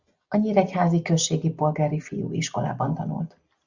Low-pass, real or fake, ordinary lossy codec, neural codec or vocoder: 7.2 kHz; real; Opus, 64 kbps; none